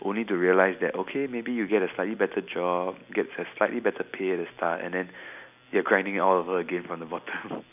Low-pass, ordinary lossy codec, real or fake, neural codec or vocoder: 3.6 kHz; none; real; none